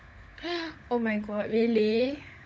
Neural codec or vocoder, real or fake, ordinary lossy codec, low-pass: codec, 16 kHz, 16 kbps, FunCodec, trained on LibriTTS, 50 frames a second; fake; none; none